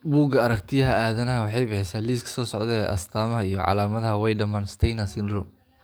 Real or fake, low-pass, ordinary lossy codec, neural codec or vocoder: fake; none; none; codec, 44.1 kHz, 7.8 kbps, DAC